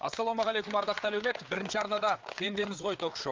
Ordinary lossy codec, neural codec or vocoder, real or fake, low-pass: Opus, 24 kbps; codec, 16 kHz, 4 kbps, FreqCodec, larger model; fake; 7.2 kHz